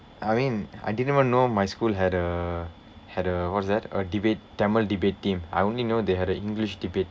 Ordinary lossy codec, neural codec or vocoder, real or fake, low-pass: none; none; real; none